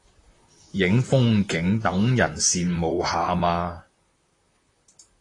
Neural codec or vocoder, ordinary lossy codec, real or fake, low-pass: vocoder, 44.1 kHz, 128 mel bands, Pupu-Vocoder; AAC, 32 kbps; fake; 10.8 kHz